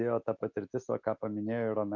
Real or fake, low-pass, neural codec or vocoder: real; 7.2 kHz; none